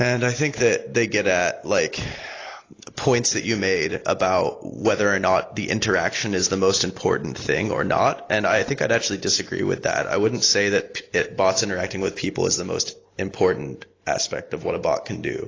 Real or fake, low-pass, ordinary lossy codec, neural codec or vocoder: real; 7.2 kHz; AAC, 32 kbps; none